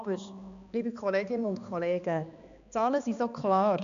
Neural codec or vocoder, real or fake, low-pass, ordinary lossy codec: codec, 16 kHz, 2 kbps, X-Codec, HuBERT features, trained on balanced general audio; fake; 7.2 kHz; none